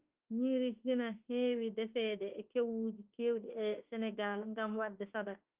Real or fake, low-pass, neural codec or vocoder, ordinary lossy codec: fake; 3.6 kHz; autoencoder, 48 kHz, 32 numbers a frame, DAC-VAE, trained on Japanese speech; Opus, 24 kbps